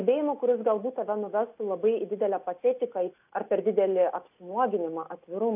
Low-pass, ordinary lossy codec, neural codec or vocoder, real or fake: 3.6 kHz; AAC, 32 kbps; none; real